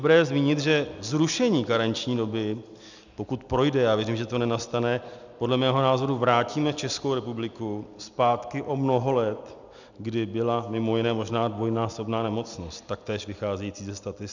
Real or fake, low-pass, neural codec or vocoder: real; 7.2 kHz; none